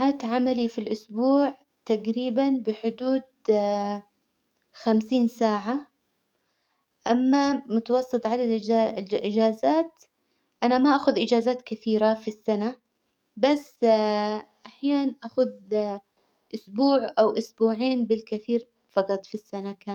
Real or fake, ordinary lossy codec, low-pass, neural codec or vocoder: fake; none; 19.8 kHz; codec, 44.1 kHz, 7.8 kbps, DAC